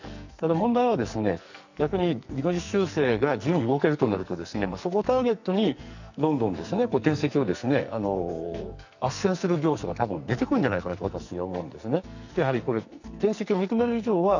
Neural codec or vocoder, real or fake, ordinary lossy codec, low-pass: codec, 44.1 kHz, 2.6 kbps, SNAC; fake; none; 7.2 kHz